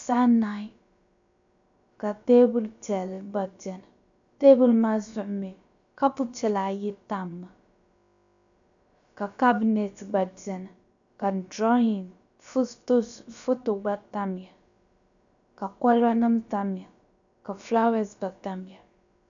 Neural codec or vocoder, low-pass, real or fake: codec, 16 kHz, about 1 kbps, DyCAST, with the encoder's durations; 7.2 kHz; fake